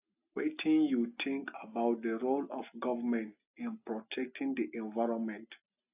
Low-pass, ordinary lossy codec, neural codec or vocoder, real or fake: 3.6 kHz; AAC, 32 kbps; none; real